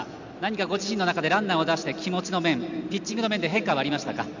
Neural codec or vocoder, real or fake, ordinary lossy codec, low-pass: none; real; none; 7.2 kHz